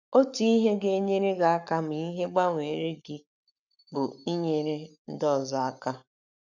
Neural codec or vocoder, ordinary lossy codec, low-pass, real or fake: codec, 44.1 kHz, 7.8 kbps, Pupu-Codec; none; 7.2 kHz; fake